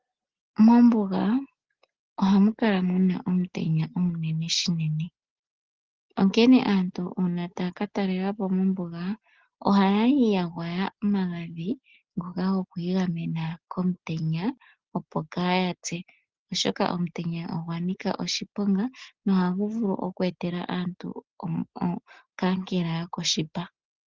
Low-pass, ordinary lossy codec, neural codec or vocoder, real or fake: 7.2 kHz; Opus, 16 kbps; none; real